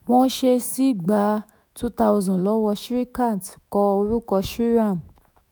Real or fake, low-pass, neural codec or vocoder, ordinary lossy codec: fake; none; autoencoder, 48 kHz, 128 numbers a frame, DAC-VAE, trained on Japanese speech; none